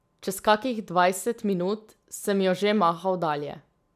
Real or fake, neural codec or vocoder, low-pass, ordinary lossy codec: real; none; 14.4 kHz; none